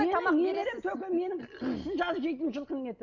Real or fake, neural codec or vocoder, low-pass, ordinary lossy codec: real; none; 7.2 kHz; none